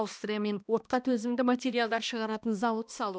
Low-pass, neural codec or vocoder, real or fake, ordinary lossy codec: none; codec, 16 kHz, 1 kbps, X-Codec, HuBERT features, trained on balanced general audio; fake; none